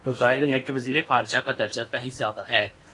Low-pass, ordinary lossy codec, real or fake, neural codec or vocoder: 10.8 kHz; AAC, 48 kbps; fake; codec, 16 kHz in and 24 kHz out, 0.8 kbps, FocalCodec, streaming, 65536 codes